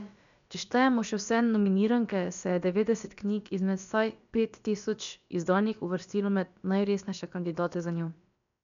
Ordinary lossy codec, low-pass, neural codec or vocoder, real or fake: none; 7.2 kHz; codec, 16 kHz, about 1 kbps, DyCAST, with the encoder's durations; fake